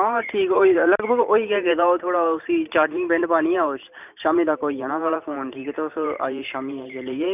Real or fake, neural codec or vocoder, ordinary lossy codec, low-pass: fake; vocoder, 44.1 kHz, 128 mel bands every 512 samples, BigVGAN v2; none; 3.6 kHz